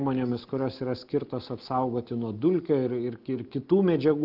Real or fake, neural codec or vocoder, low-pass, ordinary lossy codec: real; none; 5.4 kHz; Opus, 24 kbps